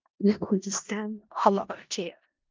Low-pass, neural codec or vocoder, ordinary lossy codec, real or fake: 7.2 kHz; codec, 16 kHz in and 24 kHz out, 0.4 kbps, LongCat-Audio-Codec, four codebook decoder; Opus, 24 kbps; fake